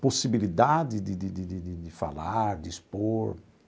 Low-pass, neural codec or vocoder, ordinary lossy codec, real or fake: none; none; none; real